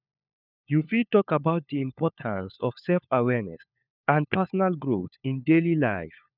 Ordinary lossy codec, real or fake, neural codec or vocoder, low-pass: none; fake; codec, 16 kHz, 4 kbps, FunCodec, trained on LibriTTS, 50 frames a second; 5.4 kHz